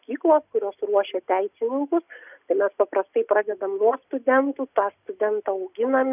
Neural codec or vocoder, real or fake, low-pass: none; real; 3.6 kHz